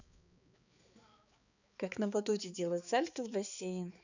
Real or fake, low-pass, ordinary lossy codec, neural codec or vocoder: fake; 7.2 kHz; none; codec, 16 kHz, 4 kbps, X-Codec, HuBERT features, trained on balanced general audio